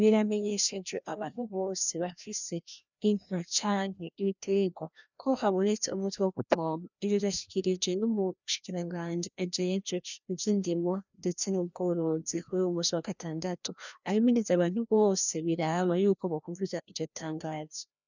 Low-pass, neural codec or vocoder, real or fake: 7.2 kHz; codec, 16 kHz, 1 kbps, FreqCodec, larger model; fake